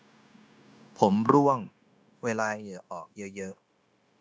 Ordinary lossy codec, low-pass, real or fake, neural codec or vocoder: none; none; fake; codec, 16 kHz, 0.9 kbps, LongCat-Audio-Codec